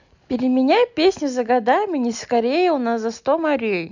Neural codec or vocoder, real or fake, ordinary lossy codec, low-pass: none; real; none; 7.2 kHz